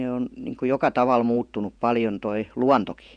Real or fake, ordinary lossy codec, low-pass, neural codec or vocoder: fake; none; 9.9 kHz; vocoder, 48 kHz, 128 mel bands, Vocos